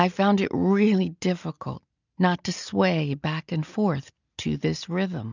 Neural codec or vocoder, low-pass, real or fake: none; 7.2 kHz; real